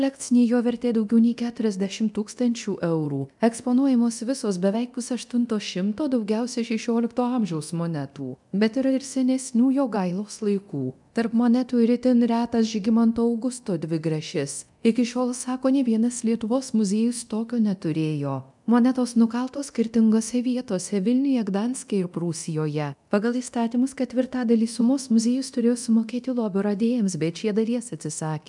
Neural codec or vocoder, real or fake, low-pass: codec, 24 kHz, 0.9 kbps, DualCodec; fake; 10.8 kHz